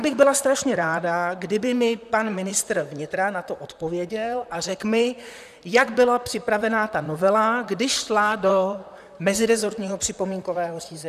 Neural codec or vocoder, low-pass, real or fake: vocoder, 44.1 kHz, 128 mel bands, Pupu-Vocoder; 14.4 kHz; fake